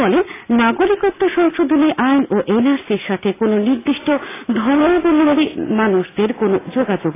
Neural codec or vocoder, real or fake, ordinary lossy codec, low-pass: none; real; AAC, 16 kbps; 3.6 kHz